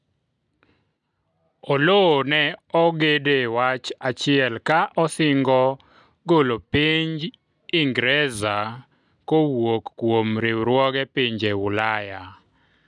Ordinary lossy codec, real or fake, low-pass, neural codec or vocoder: none; real; 10.8 kHz; none